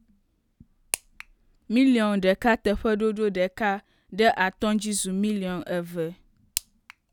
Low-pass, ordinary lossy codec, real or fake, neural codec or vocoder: 19.8 kHz; none; real; none